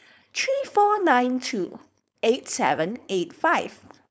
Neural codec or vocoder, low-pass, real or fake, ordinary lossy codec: codec, 16 kHz, 4.8 kbps, FACodec; none; fake; none